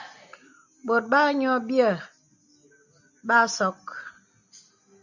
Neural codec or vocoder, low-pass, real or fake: none; 7.2 kHz; real